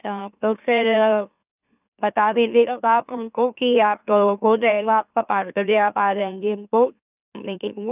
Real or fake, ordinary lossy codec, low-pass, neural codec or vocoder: fake; none; 3.6 kHz; autoencoder, 44.1 kHz, a latent of 192 numbers a frame, MeloTTS